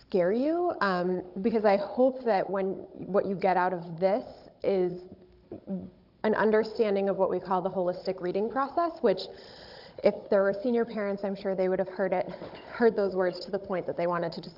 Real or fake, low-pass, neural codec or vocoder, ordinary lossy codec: fake; 5.4 kHz; codec, 16 kHz, 16 kbps, FunCodec, trained on Chinese and English, 50 frames a second; AAC, 48 kbps